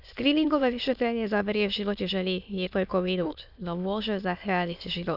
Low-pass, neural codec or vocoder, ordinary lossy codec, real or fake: 5.4 kHz; autoencoder, 22.05 kHz, a latent of 192 numbers a frame, VITS, trained on many speakers; none; fake